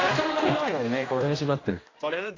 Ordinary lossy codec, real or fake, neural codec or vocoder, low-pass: AAC, 32 kbps; fake; codec, 16 kHz, 1 kbps, X-Codec, HuBERT features, trained on general audio; 7.2 kHz